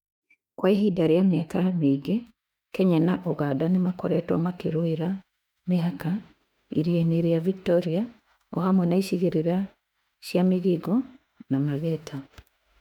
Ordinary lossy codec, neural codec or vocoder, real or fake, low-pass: none; autoencoder, 48 kHz, 32 numbers a frame, DAC-VAE, trained on Japanese speech; fake; 19.8 kHz